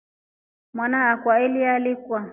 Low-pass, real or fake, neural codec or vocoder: 3.6 kHz; real; none